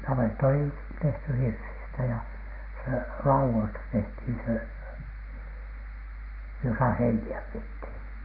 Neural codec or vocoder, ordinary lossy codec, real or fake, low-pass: none; Opus, 24 kbps; real; 5.4 kHz